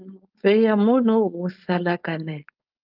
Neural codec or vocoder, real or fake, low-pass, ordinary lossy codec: codec, 16 kHz, 4.8 kbps, FACodec; fake; 5.4 kHz; Opus, 24 kbps